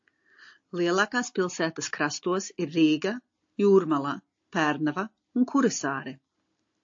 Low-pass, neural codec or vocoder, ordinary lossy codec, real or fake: 7.2 kHz; none; AAC, 48 kbps; real